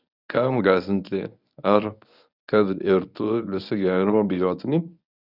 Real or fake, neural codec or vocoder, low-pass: fake; codec, 24 kHz, 0.9 kbps, WavTokenizer, medium speech release version 1; 5.4 kHz